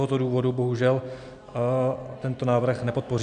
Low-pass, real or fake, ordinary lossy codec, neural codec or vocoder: 9.9 kHz; real; MP3, 96 kbps; none